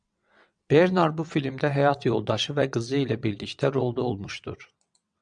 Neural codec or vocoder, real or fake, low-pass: vocoder, 22.05 kHz, 80 mel bands, WaveNeXt; fake; 9.9 kHz